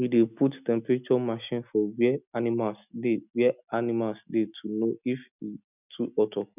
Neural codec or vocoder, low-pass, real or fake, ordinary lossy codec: none; 3.6 kHz; real; none